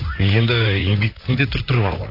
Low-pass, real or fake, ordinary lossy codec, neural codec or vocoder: 5.4 kHz; fake; AAC, 32 kbps; vocoder, 44.1 kHz, 128 mel bands, Pupu-Vocoder